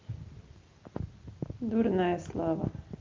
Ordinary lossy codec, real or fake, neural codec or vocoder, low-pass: Opus, 24 kbps; real; none; 7.2 kHz